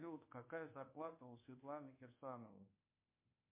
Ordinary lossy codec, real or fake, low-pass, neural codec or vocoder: MP3, 24 kbps; fake; 3.6 kHz; codec, 24 kHz, 1.2 kbps, DualCodec